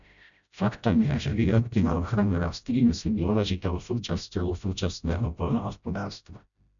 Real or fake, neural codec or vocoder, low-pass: fake; codec, 16 kHz, 0.5 kbps, FreqCodec, smaller model; 7.2 kHz